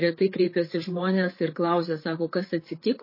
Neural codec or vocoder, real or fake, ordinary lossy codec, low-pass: codec, 16 kHz, 8 kbps, FreqCodec, larger model; fake; MP3, 24 kbps; 5.4 kHz